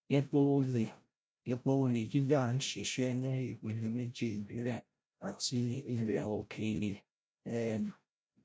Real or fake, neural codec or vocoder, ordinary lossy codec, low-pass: fake; codec, 16 kHz, 0.5 kbps, FreqCodec, larger model; none; none